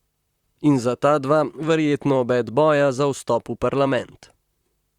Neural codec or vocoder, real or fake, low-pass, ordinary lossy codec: vocoder, 44.1 kHz, 128 mel bands, Pupu-Vocoder; fake; 19.8 kHz; Opus, 64 kbps